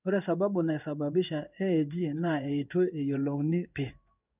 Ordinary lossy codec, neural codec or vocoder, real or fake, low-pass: none; codec, 16 kHz in and 24 kHz out, 1 kbps, XY-Tokenizer; fake; 3.6 kHz